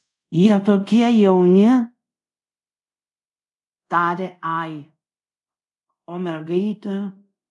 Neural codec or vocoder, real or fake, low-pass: codec, 24 kHz, 0.5 kbps, DualCodec; fake; 10.8 kHz